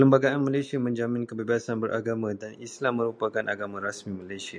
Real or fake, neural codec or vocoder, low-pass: real; none; 9.9 kHz